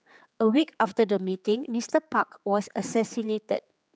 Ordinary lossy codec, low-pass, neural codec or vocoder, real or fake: none; none; codec, 16 kHz, 4 kbps, X-Codec, HuBERT features, trained on general audio; fake